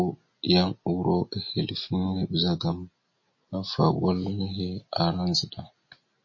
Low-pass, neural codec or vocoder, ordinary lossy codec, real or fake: 7.2 kHz; none; MP3, 32 kbps; real